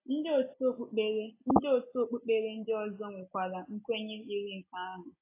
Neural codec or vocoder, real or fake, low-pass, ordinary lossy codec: none; real; 3.6 kHz; AAC, 24 kbps